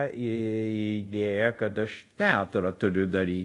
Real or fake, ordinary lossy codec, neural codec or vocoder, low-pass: fake; AAC, 48 kbps; codec, 24 kHz, 0.5 kbps, DualCodec; 10.8 kHz